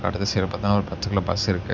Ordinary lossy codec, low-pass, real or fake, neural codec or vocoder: none; 7.2 kHz; real; none